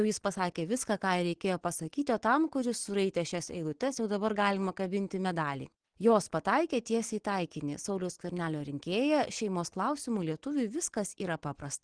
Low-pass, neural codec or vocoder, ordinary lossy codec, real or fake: 9.9 kHz; none; Opus, 16 kbps; real